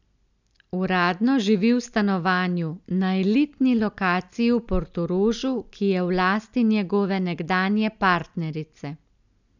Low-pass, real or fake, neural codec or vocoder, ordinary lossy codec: 7.2 kHz; real; none; none